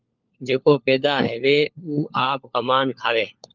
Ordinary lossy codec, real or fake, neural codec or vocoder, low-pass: Opus, 24 kbps; fake; codec, 16 kHz, 4 kbps, FunCodec, trained on LibriTTS, 50 frames a second; 7.2 kHz